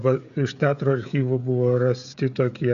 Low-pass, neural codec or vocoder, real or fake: 7.2 kHz; codec, 16 kHz, 16 kbps, FreqCodec, smaller model; fake